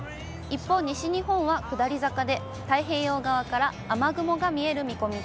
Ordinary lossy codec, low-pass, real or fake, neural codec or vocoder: none; none; real; none